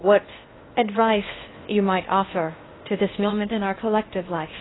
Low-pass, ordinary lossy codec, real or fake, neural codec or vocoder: 7.2 kHz; AAC, 16 kbps; fake; codec, 16 kHz in and 24 kHz out, 0.6 kbps, FocalCodec, streaming, 2048 codes